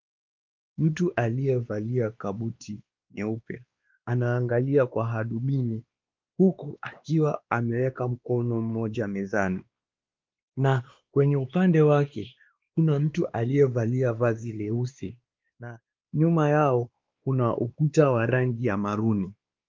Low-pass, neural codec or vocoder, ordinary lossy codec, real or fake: 7.2 kHz; codec, 16 kHz, 2 kbps, X-Codec, WavLM features, trained on Multilingual LibriSpeech; Opus, 24 kbps; fake